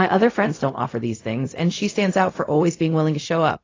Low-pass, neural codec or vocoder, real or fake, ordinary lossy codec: 7.2 kHz; codec, 16 kHz, 0.4 kbps, LongCat-Audio-Codec; fake; AAC, 32 kbps